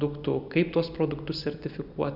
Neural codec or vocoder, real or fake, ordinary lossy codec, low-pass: none; real; Opus, 64 kbps; 5.4 kHz